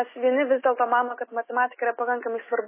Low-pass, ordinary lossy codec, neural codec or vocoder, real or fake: 3.6 kHz; MP3, 16 kbps; none; real